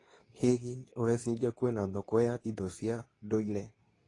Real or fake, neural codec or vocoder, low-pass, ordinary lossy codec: fake; codec, 24 kHz, 0.9 kbps, WavTokenizer, medium speech release version 2; 10.8 kHz; AAC, 32 kbps